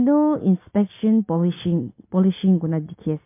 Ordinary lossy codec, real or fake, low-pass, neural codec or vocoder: MP3, 24 kbps; real; 3.6 kHz; none